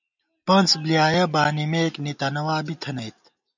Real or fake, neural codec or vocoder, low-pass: real; none; 7.2 kHz